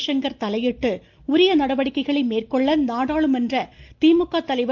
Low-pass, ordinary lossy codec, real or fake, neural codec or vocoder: 7.2 kHz; Opus, 24 kbps; real; none